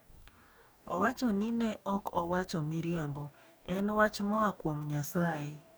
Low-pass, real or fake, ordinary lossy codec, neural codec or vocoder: none; fake; none; codec, 44.1 kHz, 2.6 kbps, DAC